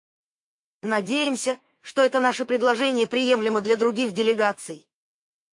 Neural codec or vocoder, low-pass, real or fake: autoencoder, 48 kHz, 128 numbers a frame, DAC-VAE, trained on Japanese speech; 10.8 kHz; fake